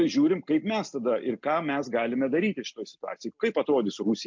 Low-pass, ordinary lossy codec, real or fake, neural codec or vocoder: 7.2 kHz; MP3, 64 kbps; real; none